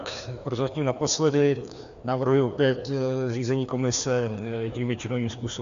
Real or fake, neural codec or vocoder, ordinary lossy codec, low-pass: fake; codec, 16 kHz, 2 kbps, FreqCodec, larger model; Opus, 64 kbps; 7.2 kHz